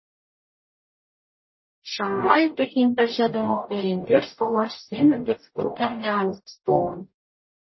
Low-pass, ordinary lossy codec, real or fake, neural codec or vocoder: 7.2 kHz; MP3, 24 kbps; fake; codec, 44.1 kHz, 0.9 kbps, DAC